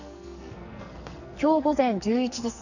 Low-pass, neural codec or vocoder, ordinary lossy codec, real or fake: 7.2 kHz; codec, 44.1 kHz, 2.6 kbps, SNAC; none; fake